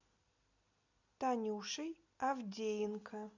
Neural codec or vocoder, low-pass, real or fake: none; 7.2 kHz; real